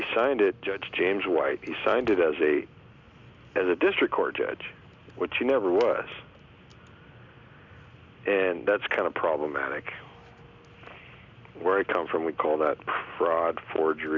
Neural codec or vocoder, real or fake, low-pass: none; real; 7.2 kHz